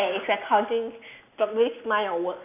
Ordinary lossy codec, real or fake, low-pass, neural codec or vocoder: none; real; 3.6 kHz; none